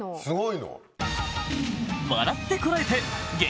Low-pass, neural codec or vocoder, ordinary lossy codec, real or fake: none; none; none; real